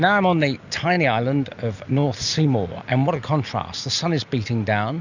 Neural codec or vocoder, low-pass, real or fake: none; 7.2 kHz; real